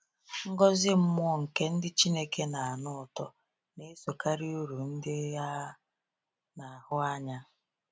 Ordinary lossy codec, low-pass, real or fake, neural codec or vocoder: none; none; real; none